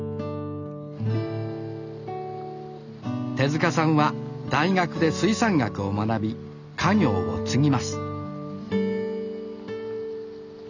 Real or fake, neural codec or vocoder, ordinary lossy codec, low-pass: real; none; none; 7.2 kHz